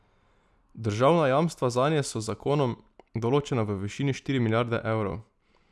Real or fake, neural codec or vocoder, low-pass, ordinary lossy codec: real; none; none; none